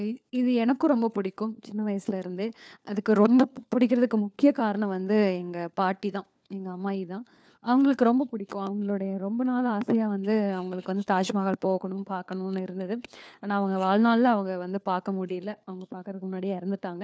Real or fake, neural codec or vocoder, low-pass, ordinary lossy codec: fake; codec, 16 kHz, 4 kbps, FunCodec, trained on LibriTTS, 50 frames a second; none; none